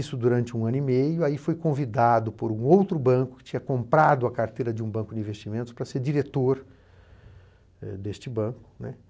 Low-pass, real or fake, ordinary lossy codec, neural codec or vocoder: none; real; none; none